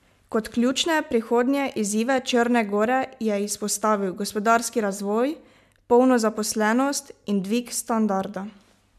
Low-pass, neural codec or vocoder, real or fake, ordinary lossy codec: 14.4 kHz; none; real; MP3, 96 kbps